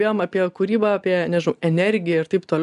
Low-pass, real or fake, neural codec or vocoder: 10.8 kHz; real; none